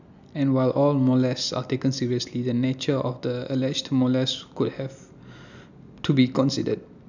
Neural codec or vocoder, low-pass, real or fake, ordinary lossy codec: none; 7.2 kHz; real; none